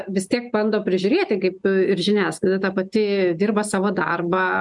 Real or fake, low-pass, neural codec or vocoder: real; 10.8 kHz; none